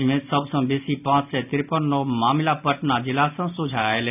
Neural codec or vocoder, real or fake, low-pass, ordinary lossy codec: none; real; 3.6 kHz; none